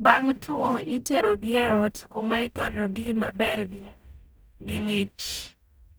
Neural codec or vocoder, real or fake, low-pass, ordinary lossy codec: codec, 44.1 kHz, 0.9 kbps, DAC; fake; none; none